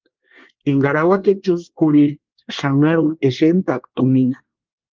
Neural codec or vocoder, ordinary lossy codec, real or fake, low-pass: codec, 24 kHz, 1 kbps, SNAC; Opus, 32 kbps; fake; 7.2 kHz